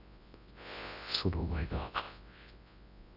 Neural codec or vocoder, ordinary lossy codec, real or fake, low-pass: codec, 24 kHz, 0.9 kbps, WavTokenizer, large speech release; none; fake; 5.4 kHz